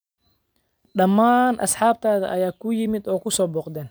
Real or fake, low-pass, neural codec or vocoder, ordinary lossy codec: real; none; none; none